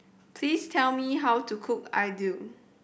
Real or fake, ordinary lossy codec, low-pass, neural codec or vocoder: real; none; none; none